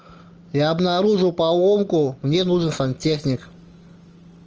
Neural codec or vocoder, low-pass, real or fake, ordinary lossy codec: none; 7.2 kHz; real; Opus, 24 kbps